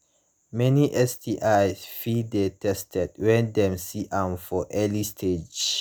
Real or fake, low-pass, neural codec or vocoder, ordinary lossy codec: real; none; none; none